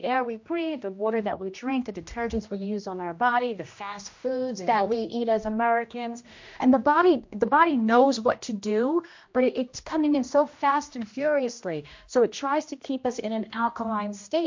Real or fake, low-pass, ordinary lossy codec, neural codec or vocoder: fake; 7.2 kHz; MP3, 48 kbps; codec, 16 kHz, 1 kbps, X-Codec, HuBERT features, trained on general audio